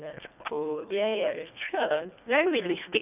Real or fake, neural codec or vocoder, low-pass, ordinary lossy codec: fake; codec, 24 kHz, 1.5 kbps, HILCodec; 3.6 kHz; none